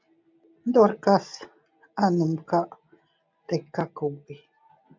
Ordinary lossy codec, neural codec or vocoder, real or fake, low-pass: MP3, 64 kbps; none; real; 7.2 kHz